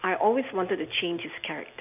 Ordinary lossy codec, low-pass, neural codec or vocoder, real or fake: none; 3.6 kHz; none; real